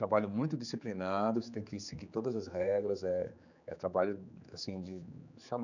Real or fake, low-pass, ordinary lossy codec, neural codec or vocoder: fake; 7.2 kHz; none; codec, 16 kHz, 4 kbps, X-Codec, HuBERT features, trained on general audio